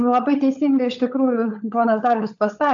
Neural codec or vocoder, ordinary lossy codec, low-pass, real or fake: codec, 16 kHz, 16 kbps, FunCodec, trained on LibriTTS, 50 frames a second; AAC, 64 kbps; 7.2 kHz; fake